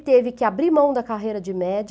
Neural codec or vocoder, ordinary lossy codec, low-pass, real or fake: none; none; none; real